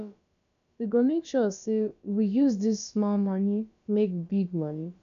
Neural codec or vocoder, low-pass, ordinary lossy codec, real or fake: codec, 16 kHz, about 1 kbps, DyCAST, with the encoder's durations; 7.2 kHz; none; fake